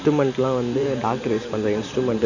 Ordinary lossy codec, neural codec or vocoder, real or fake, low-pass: none; vocoder, 44.1 kHz, 128 mel bands every 512 samples, BigVGAN v2; fake; 7.2 kHz